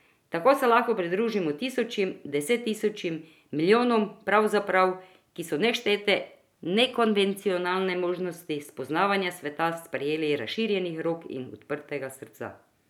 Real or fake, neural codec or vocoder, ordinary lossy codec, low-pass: real; none; none; 19.8 kHz